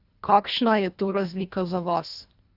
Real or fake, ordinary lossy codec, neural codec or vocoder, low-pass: fake; Opus, 64 kbps; codec, 24 kHz, 1.5 kbps, HILCodec; 5.4 kHz